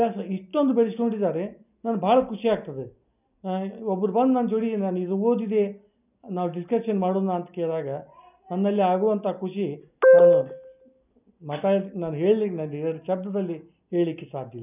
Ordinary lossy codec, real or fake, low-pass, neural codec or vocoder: none; real; 3.6 kHz; none